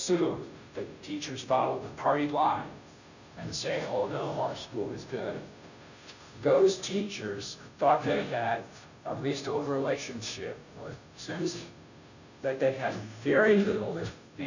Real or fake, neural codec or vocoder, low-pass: fake; codec, 16 kHz, 0.5 kbps, FunCodec, trained on Chinese and English, 25 frames a second; 7.2 kHz